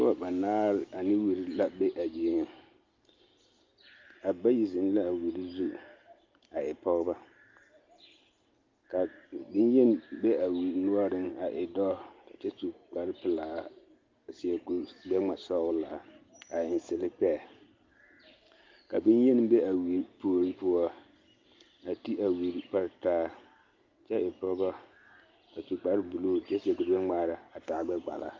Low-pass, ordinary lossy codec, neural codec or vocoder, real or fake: 7.2 kHz; Opus, 32 kbps; none; real